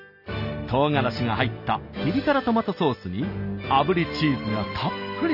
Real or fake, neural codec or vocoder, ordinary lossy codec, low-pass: real; none; none; 5.4 kHz